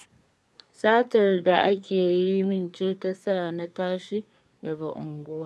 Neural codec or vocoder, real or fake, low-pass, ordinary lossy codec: codec, 24 kHz, 1 kbps, SNAC; fake; none; none